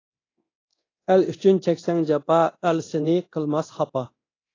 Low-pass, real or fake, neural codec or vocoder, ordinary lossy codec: 7.2 kHz; fake; codec, 24 kHz, 0.9 kbps, DualCodec; AAC, 32 kbps